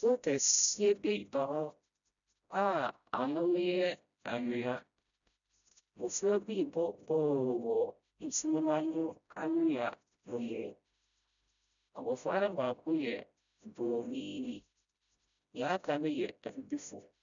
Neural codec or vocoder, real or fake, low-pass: codec, 16 kHz, 0.5 kbps, FreqCodec, smaller model; fake; 7.2 kHz